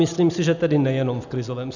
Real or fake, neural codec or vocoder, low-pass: real; none; 7.2 kHz